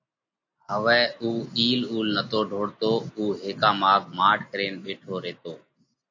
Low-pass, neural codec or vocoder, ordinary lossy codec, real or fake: 7.2 kHz; none; AAC, 48 kbps; real